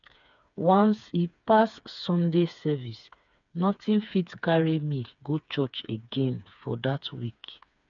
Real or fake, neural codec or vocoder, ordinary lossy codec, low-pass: fake; codec, 16 kHz, 4 kbps, FreqCodec, smaller model; none; 7.2 kHz